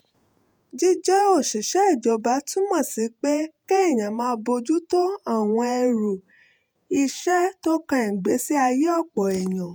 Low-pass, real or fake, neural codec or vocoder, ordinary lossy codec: none; fake; vocoder, 48 kHz, 128 mel bands, Vocos; none